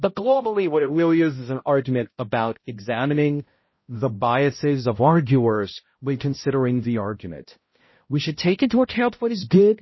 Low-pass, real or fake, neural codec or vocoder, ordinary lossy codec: 7.2 kHz; fake; codec, 16 kHz, 0.5 kbps, X-Codec, HuBERT features, trained on balanced general audio; MP3, 24 kbps